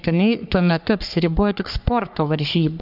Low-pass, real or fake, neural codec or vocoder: 5.4 kHz; fake; codec, 44.1 kHz, 3.4 kbps, Pupu-Codec